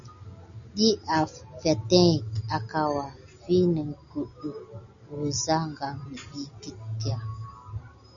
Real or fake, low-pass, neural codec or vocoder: real; 7.2 kHz; none